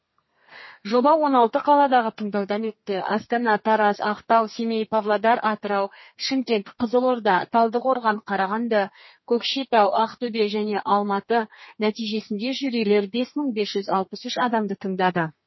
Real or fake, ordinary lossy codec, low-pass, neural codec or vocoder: fake; MP3, 24 kbps; 7.2 kHz; codec, 32 kHz, 1.9 kbps, SNAC